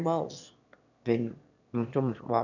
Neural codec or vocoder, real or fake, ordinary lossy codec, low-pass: autoencoder, 22.05 kHz, a latent of 192 numbers a frame, VITS, trained on one speaker; fake; none; 7.2 kHz